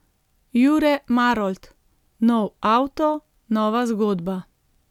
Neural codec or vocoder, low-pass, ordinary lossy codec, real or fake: none; 19.8 kHz; none; real